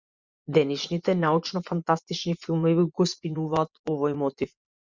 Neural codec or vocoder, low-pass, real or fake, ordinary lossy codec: vocoder, 24 kHz, 100 mel bands, Vocos; 7.2 kHz; fake; Opus, 64 kbps